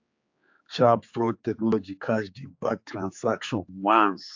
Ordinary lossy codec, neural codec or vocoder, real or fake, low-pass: AAC, 48 kbps; codec, 16 kHz, 4 kbps, X-Codec, HuBERT features, trained on general audio; fake; 7.2 kHz